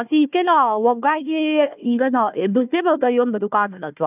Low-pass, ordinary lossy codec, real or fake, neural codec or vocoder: 3.6 kHz; none; fake; codec, 16 kHz, 1 kbps, FunCodec, trained on Chinese and English, 50 frames a second